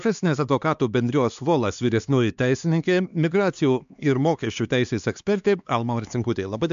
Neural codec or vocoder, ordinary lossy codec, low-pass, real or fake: codec, 16 kHz, 2 kbps, X-Codec, HuBERT features, trained on LibriSpeech; MP3, 64 kbps; 7.2 kHz; fake